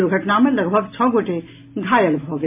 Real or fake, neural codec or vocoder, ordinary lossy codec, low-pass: real; none; MP3, 32 kbps; 3.6 kHz